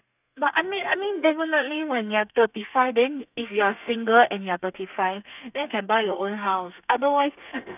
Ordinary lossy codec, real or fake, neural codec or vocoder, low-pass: none; fake; codec, 32 kHz, 1.9 kbps, SNAC; 3.6 kHz